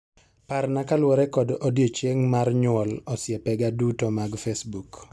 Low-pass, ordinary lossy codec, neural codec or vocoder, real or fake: none; none; none; real